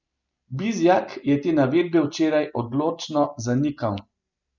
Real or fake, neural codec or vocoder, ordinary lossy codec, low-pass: real; none; none; 7.2 kHz